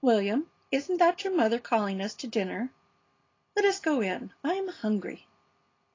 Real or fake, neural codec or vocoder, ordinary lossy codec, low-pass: real; none; AAC, 32 kbps; 7.2 kHz